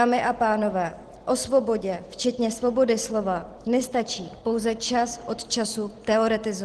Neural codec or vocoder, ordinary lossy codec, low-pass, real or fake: none; Opus, 16 kbps; 10.8 kHz; real